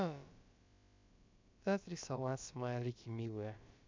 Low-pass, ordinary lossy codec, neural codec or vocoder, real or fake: 7.2 kHz; MP3, 64 kbps; codec, 16 kHz, about 1 kbps, DyCAST, with the encoder's durations; fake